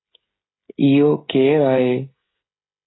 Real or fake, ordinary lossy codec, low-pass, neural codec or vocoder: fake; AAC, 16 kbps; 7.2 kHz; codec, 16 kHz, 16 kbps, FreqCodec, smaller model